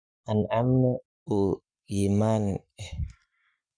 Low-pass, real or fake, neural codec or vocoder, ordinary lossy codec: 9.9 kHz; fake; codec, 44.1 kHz, 7.8 kbps, Pupu-Codec; none